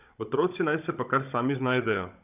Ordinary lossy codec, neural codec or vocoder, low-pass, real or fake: none; codec, 16 kHz, 16 kbps, FunCodec, trained on Chinese and English, 50 frames a second; 3.6 kHz; fake